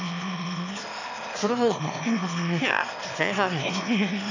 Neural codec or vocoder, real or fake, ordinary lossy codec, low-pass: autoencoder, 22.05 kHz, a latent of 192 numbers a frame, VITS, trained on one speaker; fake; AAC, 48 kbps; 7.2 kHz